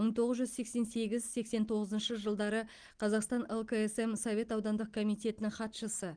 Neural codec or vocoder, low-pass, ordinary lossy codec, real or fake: none; 9.9 kHz; Opus, 24 kbps; real